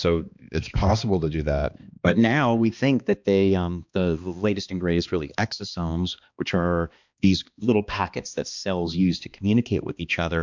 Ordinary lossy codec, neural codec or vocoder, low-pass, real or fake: MP3, 64 kbps; codec, 16 kHz, 2 kbps, X-Codec, HuBERT features, trained on balanced general audio; 7.2 kHz; fake